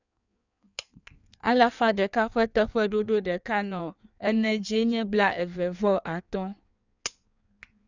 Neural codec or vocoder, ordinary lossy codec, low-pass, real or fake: codec, 16 kHz in and 24 kHz out, 1.1 kbps, FireRedTTS-2 codec; none; 7.2 kHz; fake